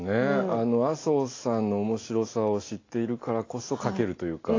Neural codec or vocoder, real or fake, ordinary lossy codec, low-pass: none; real; AAC, 32 kbps; 7.2 kHz